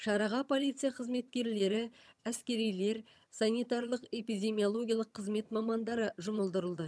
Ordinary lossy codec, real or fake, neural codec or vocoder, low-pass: none; fake; vocoder, 22.05 kHz, 80 mel bands, HiFi-GAN; none